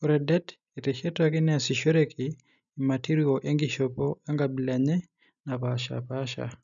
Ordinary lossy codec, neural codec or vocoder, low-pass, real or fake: none; none; 7.2 kHz; real